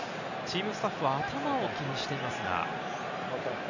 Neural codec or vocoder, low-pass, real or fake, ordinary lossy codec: none; 7.2 kHz; real; none